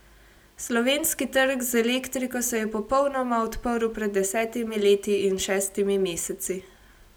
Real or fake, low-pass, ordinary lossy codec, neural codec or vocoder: real; none; none; none